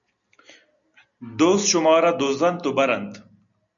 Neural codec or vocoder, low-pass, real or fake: none; 7.2 kHz; real